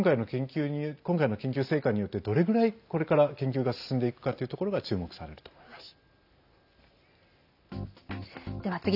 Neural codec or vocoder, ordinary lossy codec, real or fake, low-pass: none; none; real; 5.4 kHz